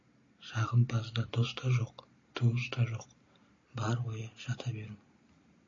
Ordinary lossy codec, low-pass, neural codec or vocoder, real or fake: AAC, 32 kbps; 7.2 kHz; none; real